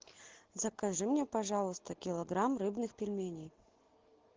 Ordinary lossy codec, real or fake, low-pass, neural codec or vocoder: Opus, 16 kbps; real; 7.2 kHz; none